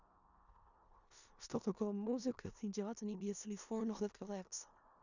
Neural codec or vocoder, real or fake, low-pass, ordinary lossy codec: codec, 16 kHz in and 24 kHz out, 0.4 kbps, LongCat-Audio-Codec, four codebook decoder; fake; 7.2 kHz; none